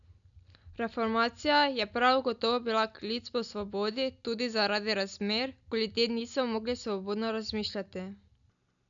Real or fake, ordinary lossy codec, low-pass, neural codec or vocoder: real; none; 7.2 kHz; none